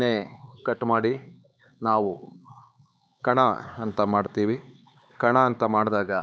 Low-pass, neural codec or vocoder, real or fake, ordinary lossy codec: none; codec, 16 kHz, 4 kbps, X-Codec, HuBERT features, trained on LibriSpeech; fake; none